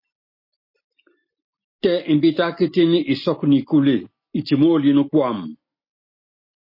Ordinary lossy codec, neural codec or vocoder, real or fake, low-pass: MP3, 32 kbps; none; real; 5.4 kHz